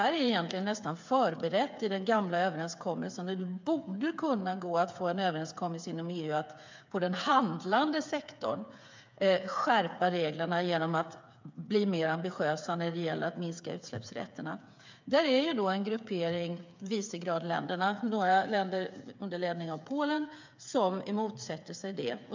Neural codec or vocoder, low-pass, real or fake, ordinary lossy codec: codec, 16 kHz, 8 kbps, FreqCodec, smaller model; 7.2 kHz; fake; MP3, 64 kbps